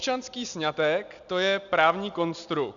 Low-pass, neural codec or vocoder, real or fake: 7.2 kHz; none; real